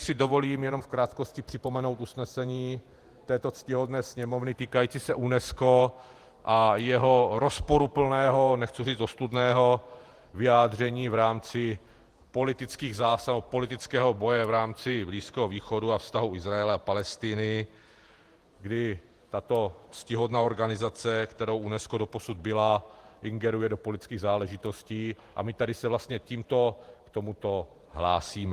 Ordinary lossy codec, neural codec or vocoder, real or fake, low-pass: Opus, 24 kbps; vocoder, 48 kHz, 128 mel bands, Vocos; fake; 14.4 kHz